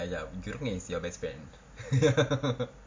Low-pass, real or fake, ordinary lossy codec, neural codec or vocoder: 7.2 kHz; real; MP3, 48 kbps; none